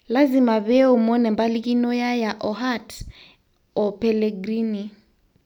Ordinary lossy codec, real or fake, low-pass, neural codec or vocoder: none; real; 19.8 kHz; none